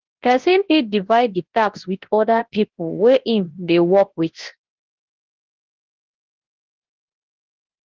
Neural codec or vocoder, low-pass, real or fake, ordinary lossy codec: codec, 24 kHz, 0.9 kbps, WavTokenizer, large speech release; 7.2 kHz; fake; Opus, 16 kbps